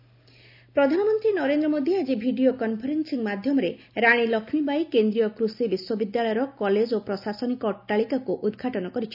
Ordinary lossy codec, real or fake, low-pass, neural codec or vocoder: none; real; 5.4 kHz; none